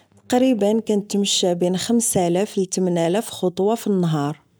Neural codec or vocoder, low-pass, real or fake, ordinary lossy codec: none; none; real; none